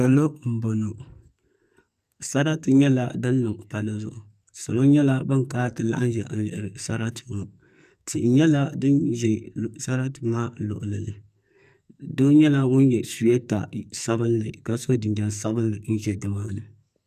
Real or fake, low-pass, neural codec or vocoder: fake; 14.4 kHz; codec, 44.1 kHz, 2.6 kbps, SNAC